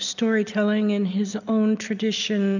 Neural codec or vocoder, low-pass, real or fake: none; 7.2 kHz; real